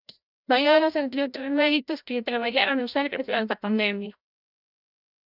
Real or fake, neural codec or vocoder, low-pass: fake; codec, 16 kHz, 0.5 kbps, FreqCodec, larger model; 5.4 kHz